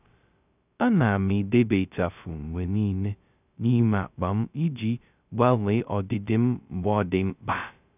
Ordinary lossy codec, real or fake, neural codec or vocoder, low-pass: none; fake; codec, 16 kHz, 0.2 kbps, FocalCodec; 3.6 kHz